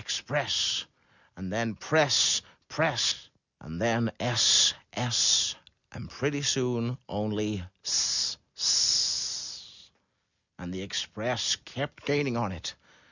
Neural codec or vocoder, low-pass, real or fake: none; 7.2 kHz; real